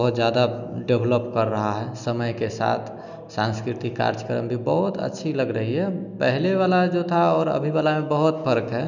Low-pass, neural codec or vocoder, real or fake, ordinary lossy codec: 7.2 kHz; none; real; none